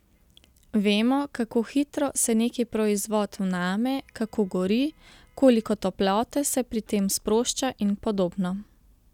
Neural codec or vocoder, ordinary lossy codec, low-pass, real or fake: none; none; 19.8 kHz; real